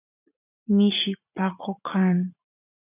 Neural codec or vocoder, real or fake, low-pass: vocoder, 44.1 kHz, 80 mel bands, Vocos; fake; 3.6 kHz